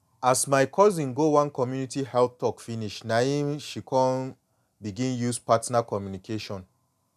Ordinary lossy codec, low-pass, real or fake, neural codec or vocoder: none; 14.4 kHz; real; none